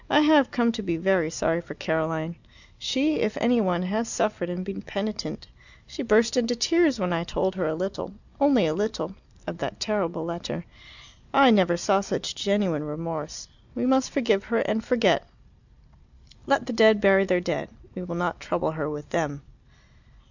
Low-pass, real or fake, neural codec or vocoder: 7.2 kHz; real; none